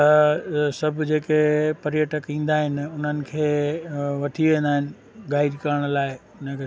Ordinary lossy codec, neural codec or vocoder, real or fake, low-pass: none; none; real; none